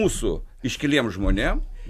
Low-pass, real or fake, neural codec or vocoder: 14.4 kHz; real; none